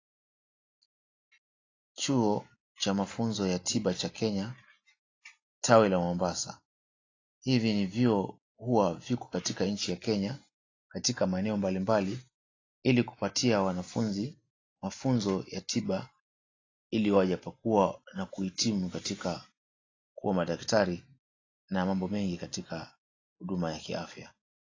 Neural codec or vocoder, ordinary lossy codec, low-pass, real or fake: none; AAC, 32 kbps; 7.2 kHz; real